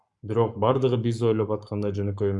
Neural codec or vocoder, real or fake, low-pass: codec, 44.1 kHz, 7.8 kbps, Pupu-Codec; fake; 10.8 kHz